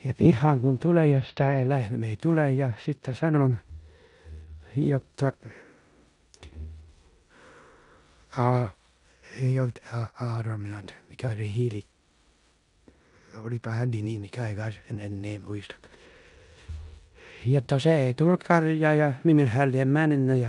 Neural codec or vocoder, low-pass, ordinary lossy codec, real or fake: codec, 16 kHz in and 24 kHz out, 0.9 kbps, LongCat-Audio-Codec, four codebook decoder; 10.8 kHz; none; fake